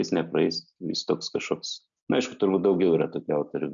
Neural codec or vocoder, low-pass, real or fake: none; 7.2 kHz; real